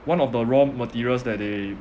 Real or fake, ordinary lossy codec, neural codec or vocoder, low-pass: real; none; none; none